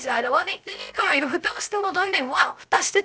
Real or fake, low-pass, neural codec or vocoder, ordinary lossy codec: fake; none; codec, 16 kHz, 0.3 kbps, FocalCodec; none